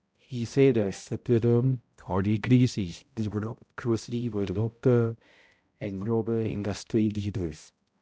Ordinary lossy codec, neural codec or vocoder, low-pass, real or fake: none; codec, 16 kHz, 0.5 kbps, X-Codec, HuBERT features, trained on balanced general audio; none; fake